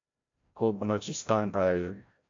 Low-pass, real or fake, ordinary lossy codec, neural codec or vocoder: 7.2 kHz; fake; AAC, 48 kbps; codec, 16 kHz, 0.5 kbps, FreqCodec, larger model